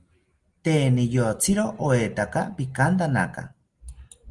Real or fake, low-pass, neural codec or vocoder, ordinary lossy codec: real; 10.8 kHz; none; Opus, 24 kbps